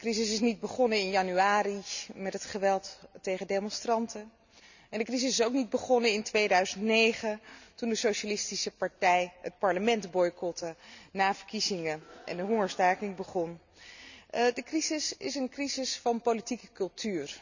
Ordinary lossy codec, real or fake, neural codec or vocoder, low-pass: none; real; none; 7.2 kHz